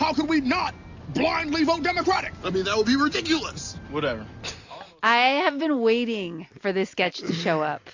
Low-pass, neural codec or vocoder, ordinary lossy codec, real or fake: 7.2 kHz; none; AAC, 48 kbps; real